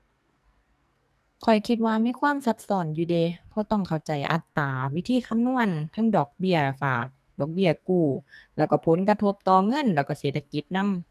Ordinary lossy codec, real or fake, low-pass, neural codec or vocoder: none; fake; 14.4 kHz; codec, 44.1 kHz, 2.6 kbps, SNAC